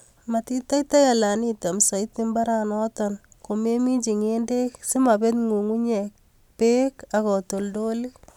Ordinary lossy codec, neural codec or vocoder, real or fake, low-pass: none; none; real; 19.8 kHz